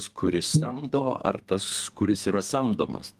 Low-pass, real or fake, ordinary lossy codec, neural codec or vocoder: 14.4 kHz; fake; Opus, 24 kbps; codec, 32 kHz, 1.9 kbps, SNAC